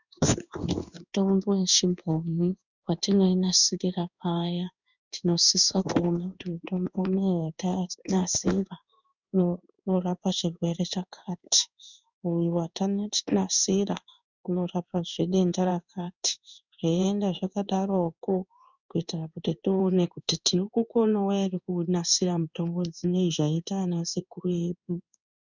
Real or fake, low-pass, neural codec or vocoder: fake; 7.2 kHz; codec, 16 kHz in and 24 kHz out, 1 kbps, XY-Tokenizer